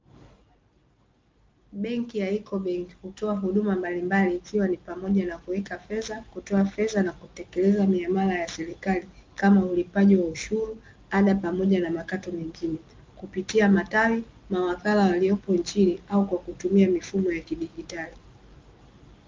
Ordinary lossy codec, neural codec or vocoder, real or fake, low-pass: Opus, 32 kbps; none; real; 7.2 kHz